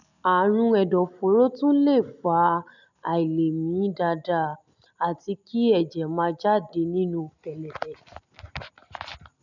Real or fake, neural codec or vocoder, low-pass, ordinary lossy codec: real; none; 7.2 kHz; none